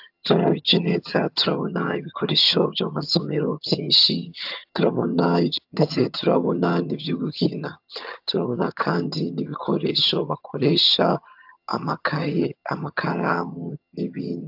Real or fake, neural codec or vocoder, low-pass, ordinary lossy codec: fake; vocoder, 22.05 kHz, 80 mel bands, HiFi-GAN; 5.4 kHz; AAC, 48 kbps